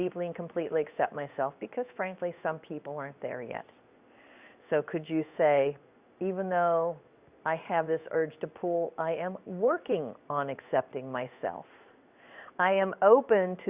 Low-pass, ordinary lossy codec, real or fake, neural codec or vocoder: 3.6 kHz; Opus, 64 kbps; fake; codec, 16 kHz in and 24 kHz out, 1 kbps, XY-Tokenizer